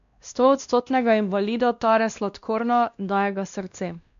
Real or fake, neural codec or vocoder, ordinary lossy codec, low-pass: fake; codec, 16 kHz, 1 kbps, X-Codec, WavLM features, trained on Multilingual LibriSpeech; MP3, 64 kbps; 7.2 kHz